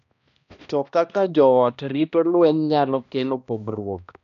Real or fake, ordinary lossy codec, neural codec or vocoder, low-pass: fake; none; codec, 16 kHz, 1 kbps, X-Codec, HuBERT features, trained on balanced general audio; 7.2 kHz